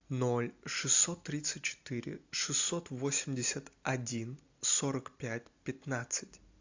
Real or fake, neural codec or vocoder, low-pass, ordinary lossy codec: real; none; 7.2 kHz; AAC, 48 kbps